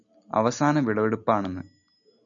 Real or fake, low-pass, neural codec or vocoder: real; 7.2 kHz; none